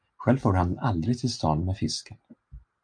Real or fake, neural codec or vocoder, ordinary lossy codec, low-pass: real; none; MP3, 96 kbps; 9.9 kHz